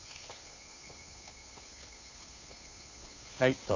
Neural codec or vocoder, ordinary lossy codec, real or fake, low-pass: codec, 16 kHz, 2 kbps, FunCodec, trained on Chinese and English, 25 frames a second; AAC, 48 kbps; fake; 7.2 kHz